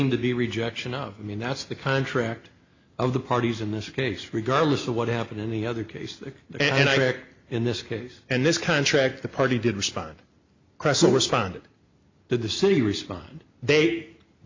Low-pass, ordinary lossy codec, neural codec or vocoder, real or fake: 7.2 kHz; MP3, 64 kbps; none; real